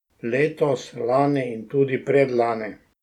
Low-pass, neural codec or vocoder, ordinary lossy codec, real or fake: 19.8 kHz; none; none; real